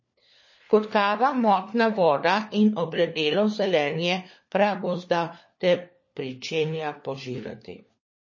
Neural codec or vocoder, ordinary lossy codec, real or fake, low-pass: codec, 16 kHz, 4 kbps, FunCodec, trained on LibriTTS, 50 frames a second; MP3, 32 kbps; fake; 7.2 kHz